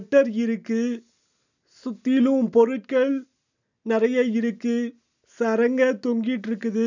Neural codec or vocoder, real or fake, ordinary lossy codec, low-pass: none; real; none; 7.2 kHz